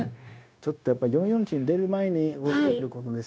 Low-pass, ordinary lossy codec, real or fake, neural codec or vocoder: none; none; fake; codec, 16 kHz, 0.9 kbps, LongCat-Audio-Codec